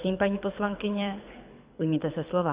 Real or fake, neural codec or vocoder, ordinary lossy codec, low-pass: fake; codec, 24 kHz, 6 kbps, HILCodec; Opus, 64 kbps; 3.6 kHz